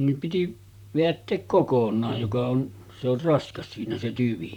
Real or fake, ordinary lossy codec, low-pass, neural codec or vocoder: fake; none; 19.8 kHz; vocoder, 44.1 kHz, 128 mel bands, Pupu-Vocoder